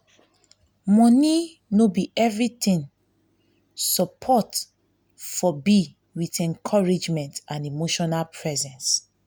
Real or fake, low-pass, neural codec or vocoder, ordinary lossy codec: real; none; none; none